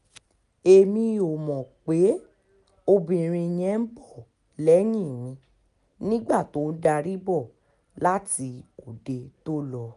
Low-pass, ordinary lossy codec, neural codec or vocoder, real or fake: 10.8 kHz; none; none; real